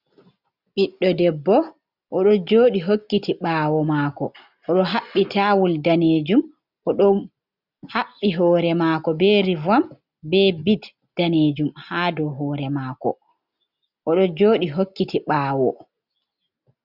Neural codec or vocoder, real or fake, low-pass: none; real; 5.4 kHz